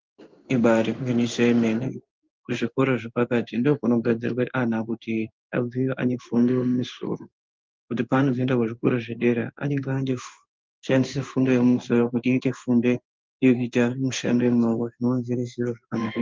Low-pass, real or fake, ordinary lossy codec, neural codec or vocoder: 7.2 kHz; fake; Opus, 24 kbps; codec, 16 kHz in and 24 kHz out, 1 kbps, XY-Tokenizer